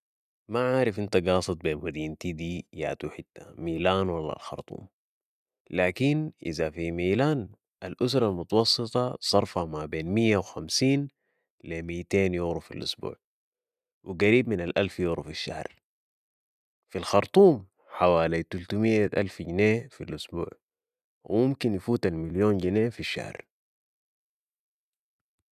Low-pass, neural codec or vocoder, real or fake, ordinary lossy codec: 14.4 kHz; none; real; none